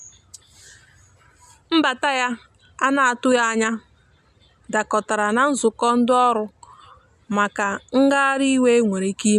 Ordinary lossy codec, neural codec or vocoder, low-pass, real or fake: none; none; 14.4 kHz; real